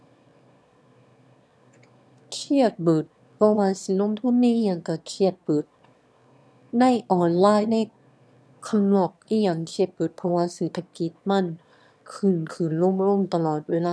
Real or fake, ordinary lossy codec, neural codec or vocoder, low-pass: fake; none; autoencoder, 22.05 kHz, a latent of 192 numbers a frame, VITS, trained on one speaker; none